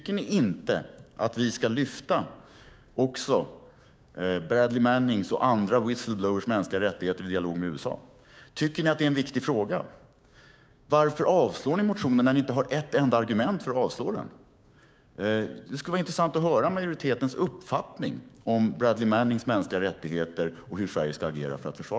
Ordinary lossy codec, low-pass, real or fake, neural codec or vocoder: none; none; fake; codec, 16 kHz, 6 kbps, DAC